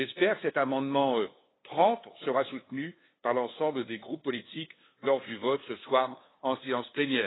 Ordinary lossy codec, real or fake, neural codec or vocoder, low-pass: AAC, 16 kbps; fake; codec, 16 kHz, 2 kbps, FunCodec, trained on LibriTTS, 25 frames a second; 7.2 kHz